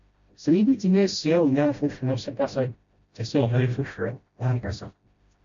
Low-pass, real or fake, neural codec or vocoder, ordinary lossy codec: 7.2 kHz; fake; codec, 16 kHz, 0.5 kbps, FreqCodec, smaller model; MP3, 48 kbps